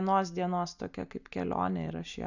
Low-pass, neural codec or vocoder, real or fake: 7.2 kHz; none; real